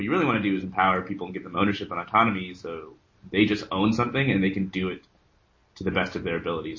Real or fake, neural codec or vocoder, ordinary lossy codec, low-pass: real; none; MP3, 32 kbps; 7.2 kHz